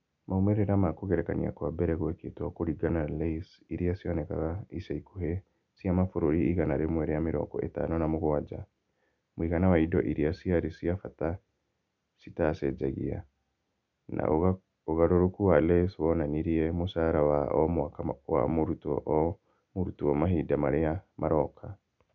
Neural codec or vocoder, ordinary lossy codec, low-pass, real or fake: none; none; 7.2 kHz; real